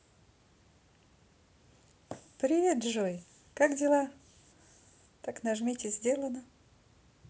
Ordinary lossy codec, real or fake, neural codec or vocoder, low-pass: none; real; none; none